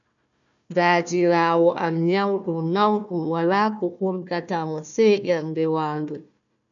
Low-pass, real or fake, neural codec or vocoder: 7.2 kHz; fake; codec, 16 kHz, 1 kbps, FunCodec, trained on Chinese and English, 50 frames a second